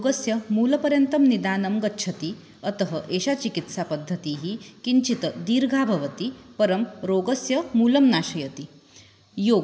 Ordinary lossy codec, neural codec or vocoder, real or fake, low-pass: none; none; real; none